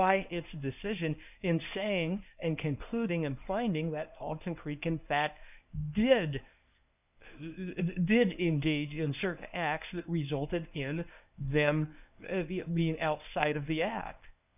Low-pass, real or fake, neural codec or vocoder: 3.6 kHz; fake; codec, 24 kHz, 0.9 kbps, WavTokenizer, medium speech release version 1